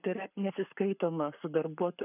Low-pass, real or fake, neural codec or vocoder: 3.6 kHz; fake; codec, 44.1 kHz, 7.8 kbps, Pupu-Codec